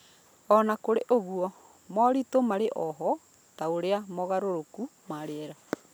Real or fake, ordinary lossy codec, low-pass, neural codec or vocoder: real; none; none; none